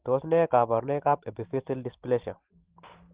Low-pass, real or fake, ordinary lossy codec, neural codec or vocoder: 3.6 kHz; real; Opus, 16 kbps; none